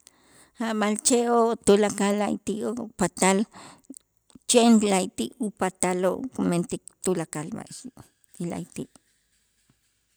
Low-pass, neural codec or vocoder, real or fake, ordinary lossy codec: none; none; real; none